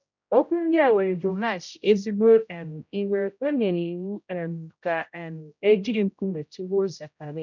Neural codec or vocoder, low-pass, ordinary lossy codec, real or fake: codec, 16 kHz, 0.5 kbps, X-Codec, HuBERT features, trained on general audio; 7.2 kHz; none; fake